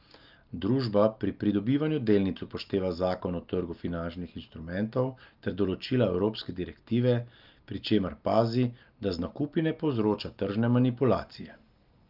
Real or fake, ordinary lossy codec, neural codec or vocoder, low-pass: real; Opus, 24 kbps; none; 5.4 kHz